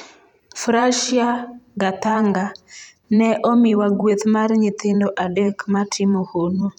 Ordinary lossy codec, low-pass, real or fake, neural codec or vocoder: none; 19.8 kHz; fake; vocoder, 44.1 kHz, 128 mel bands every 512 samples, BigVGAN v2